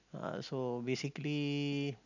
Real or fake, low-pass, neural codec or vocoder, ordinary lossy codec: real; 7.2 kHz; none; none